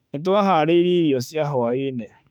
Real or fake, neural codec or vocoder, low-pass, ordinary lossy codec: fake; autoencoder, 48 kHz, 32 numbers a frame, DAC-VAE, trained on Japanese speech; 19.8 kHz; none